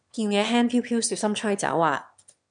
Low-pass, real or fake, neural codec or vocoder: 9.9 kHz; fake; autoencoder, 22.05 kHz, a latent of 192 numbers a frame, VITS, trained on one speaker